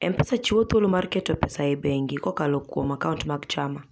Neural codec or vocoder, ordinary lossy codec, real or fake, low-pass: none; none; real; none